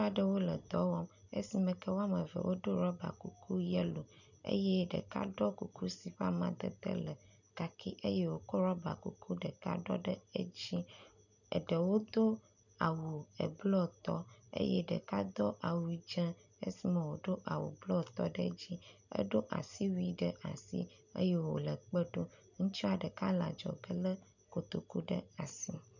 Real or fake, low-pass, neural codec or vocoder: real; 7.2 kHz; none